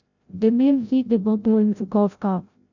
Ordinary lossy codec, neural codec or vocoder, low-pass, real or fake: none; codec, 16 kHz, 0.5 kbps, FreqCodec, larger model; 7.2 kHz; fake